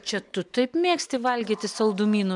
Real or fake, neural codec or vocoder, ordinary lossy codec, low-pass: real; none; MP3, 96 kbps; 10.8 kHz